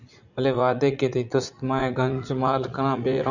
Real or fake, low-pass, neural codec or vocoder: fake; 7.2 kHz; vocoder, 44.1 kHz, 80 mel bands, Vocos